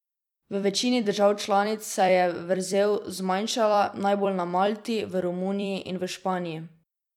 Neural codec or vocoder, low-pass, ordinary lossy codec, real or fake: vocoder, 44.1 kHz, 128 mel bands every 256 samples, BigVGAN v2; 19.8 kHz; none; fake